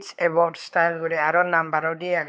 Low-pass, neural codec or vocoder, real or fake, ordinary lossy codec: none; codec, 16 kHz, 4 kbps, X-Codec, WavLM features, trained on Multilingual LibriSpeech; fake; none